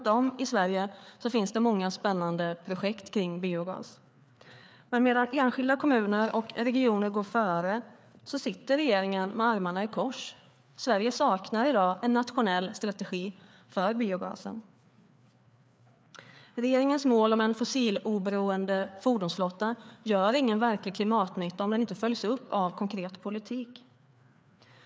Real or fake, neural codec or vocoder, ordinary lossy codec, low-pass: fake; codec, 16 kHz, 4 kbps, FreqCodec, larger model; none; none